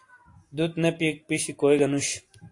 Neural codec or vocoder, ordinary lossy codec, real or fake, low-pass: none; AAC, 48 kbps; real; 10.8 kHz